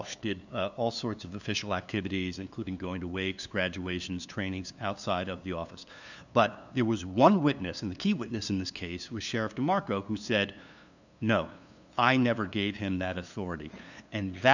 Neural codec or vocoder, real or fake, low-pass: codec, 16 kHz, 2 kbps, FunCodec, trained on LibriTTS, 25 frames a second; fake; 7.2 kHz